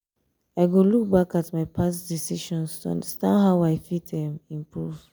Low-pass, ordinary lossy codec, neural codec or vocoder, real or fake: none; none; none; real